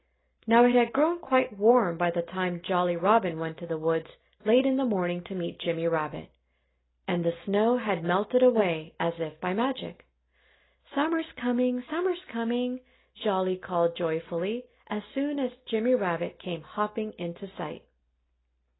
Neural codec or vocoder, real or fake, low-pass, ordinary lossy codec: none; real; 7.2 kHz; AAC, 16 kbps